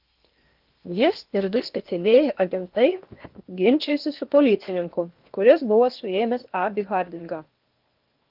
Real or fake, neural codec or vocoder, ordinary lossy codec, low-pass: fake; codec, 16 kHz in and 24 kHz out, 0.8 kbps, FocalCodec, streaming, 65536 codes; Opus, 32 kbps; 5.4 kHz